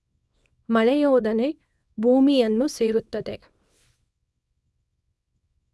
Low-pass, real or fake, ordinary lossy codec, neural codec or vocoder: none; fake; none; codec, 24 kHz, 0.9 kbps, WavTokenizer, small release